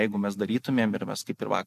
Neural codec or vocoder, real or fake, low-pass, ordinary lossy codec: none; real; 14.4 kHz; MP3, 64 kbps